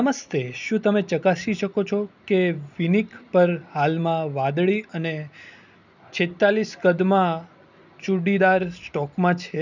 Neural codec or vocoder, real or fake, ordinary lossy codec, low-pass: none; real; none; 7.2 kHz